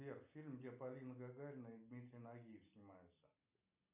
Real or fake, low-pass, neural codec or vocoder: real; 3.6 kHz; none